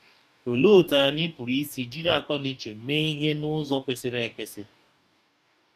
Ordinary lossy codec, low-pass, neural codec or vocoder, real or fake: none; 14.4 kHz; codec, 44.1 kHz, 2.6 kbps, DAC; fake